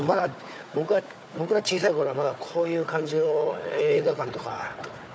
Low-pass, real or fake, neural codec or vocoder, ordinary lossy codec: none; fake; codec, 16 kHz, 4 kbps, FunCodec, trained on Chinese and English, 50 frames a second; none